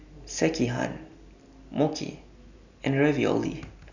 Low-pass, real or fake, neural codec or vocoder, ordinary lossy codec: 7.2 kHz; real; none; none